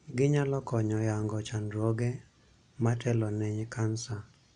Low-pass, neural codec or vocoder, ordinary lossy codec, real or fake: 9.9 kHz; none; none; real